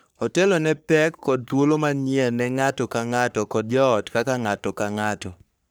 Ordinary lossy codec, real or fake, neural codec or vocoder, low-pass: none; fake; codec, 44.1 kHz, 3.4 kbps, Pupu-Codec; none